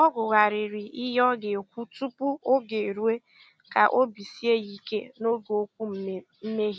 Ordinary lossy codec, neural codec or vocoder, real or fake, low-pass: none; none; real; none